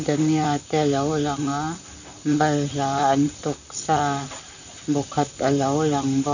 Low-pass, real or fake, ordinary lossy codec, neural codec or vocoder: 7.2 kHz; fake; none; vocoder, 44.1 kHz, 128 mel bands, Pupu-Vocoder